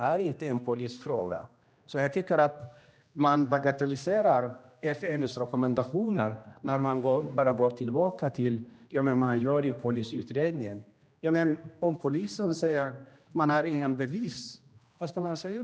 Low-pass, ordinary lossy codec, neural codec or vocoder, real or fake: none; none; codec, 16 kHz, 1 kbps, X-Codec, HuBERT features, trained on general audio; fake